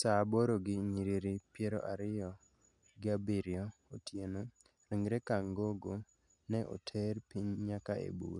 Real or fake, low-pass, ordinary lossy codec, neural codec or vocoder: real; 10.8 kHz; none; none